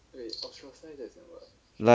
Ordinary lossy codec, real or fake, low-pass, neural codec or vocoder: none; real; none; none